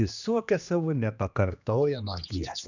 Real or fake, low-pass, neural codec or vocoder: fake; 7.2 kHz; codec, 16 kHz, 2 kbps, X-Codec, HuBERT features, trained on general audio